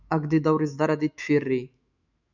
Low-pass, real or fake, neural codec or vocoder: 7.2 kHz; fake; autoencoder, 48 kHz, 128 numbers a frame, DAC-VAE, trained on Japanese speech